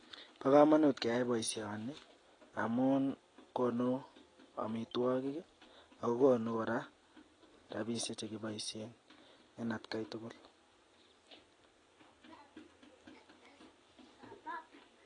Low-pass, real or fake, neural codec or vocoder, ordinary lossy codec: 9.9 kHz; real; none; AAC, 32 kbps